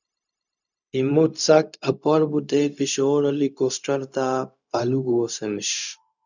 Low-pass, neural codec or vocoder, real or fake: 7.2 kHz; codec, 16 kHz, 0.4 kbps, LongCat-Audio-Codec; fake